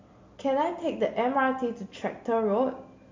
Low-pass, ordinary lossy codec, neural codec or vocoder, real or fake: 7.2 kHz; MP3, 48 kbps; none; real